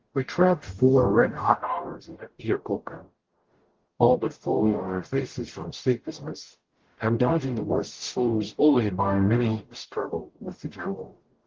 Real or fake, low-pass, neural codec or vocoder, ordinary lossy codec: fake; 7.2 kHz; codec, 44.1 kHz, 0.9 kbps, DAC; Opus, 16 kbps